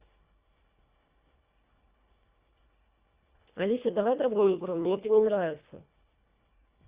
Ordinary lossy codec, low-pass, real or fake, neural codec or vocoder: Opus, 64 kbps; 3.6 kHz; fake; codec, 24 kHz, 1.5 kbps, HILCodec